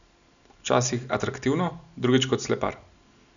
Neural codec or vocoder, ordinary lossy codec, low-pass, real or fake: none; none; 7.2 kHz; real